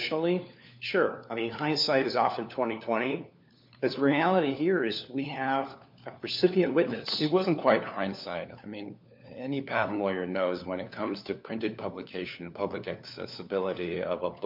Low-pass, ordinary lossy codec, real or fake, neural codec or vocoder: 5.4 kHz; MP3, 48 kbps; fake; codec, 16 kHz, 4 kbps, FunCodec, trained on LibriTTS, 50 frames a second